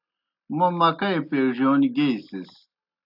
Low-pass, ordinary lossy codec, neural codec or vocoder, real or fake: 5.4 kHz; AAC, 48 kbps; none; real